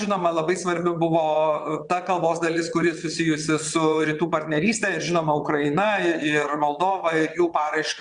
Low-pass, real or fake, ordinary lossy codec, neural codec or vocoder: 9.9 kHz; fake; Opus, 64 kbps; vocoder, 22.05 kHz, 80 mel bands, WaveNeXt